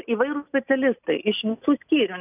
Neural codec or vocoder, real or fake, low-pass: none; real; 3.6 kHz